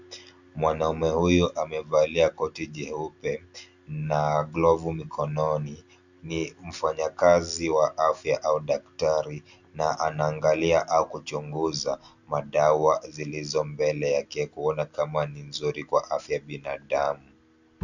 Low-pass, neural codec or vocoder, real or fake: 7.2 kHz; none; real